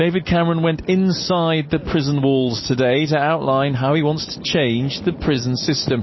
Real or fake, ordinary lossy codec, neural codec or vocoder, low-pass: real; MP3, 24 kbps; none; 7.2 kHz